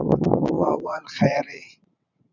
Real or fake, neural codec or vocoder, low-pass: fake; vocoder, 22.05 kHz, 80 mel bands, WaveNeXt; 7.2 kHz